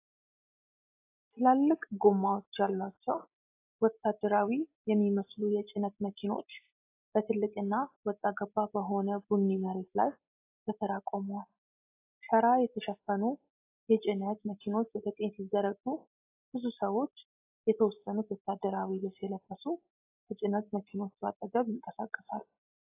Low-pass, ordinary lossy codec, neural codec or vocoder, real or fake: 3.6 kHz; AAC, 24 kbps; none; real